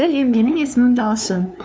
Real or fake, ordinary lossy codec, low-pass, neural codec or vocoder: fake; none; none; codec, 16 kHz, 2 kbps, FunCodec, trained on LibriTTS, 25 frames a second